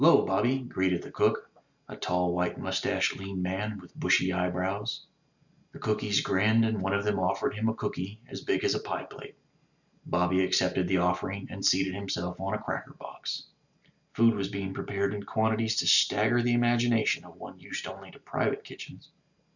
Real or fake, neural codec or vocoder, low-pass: real; none; 7.2 kHz